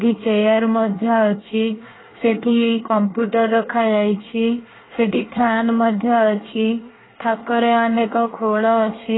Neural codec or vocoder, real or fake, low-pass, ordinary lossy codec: codec, 24 kHz, 1 kbps, SNAC; fake; 7.2 kHz; AAC, 16 kbps